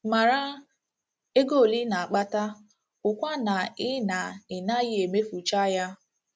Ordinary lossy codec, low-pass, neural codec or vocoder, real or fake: none; none; none; real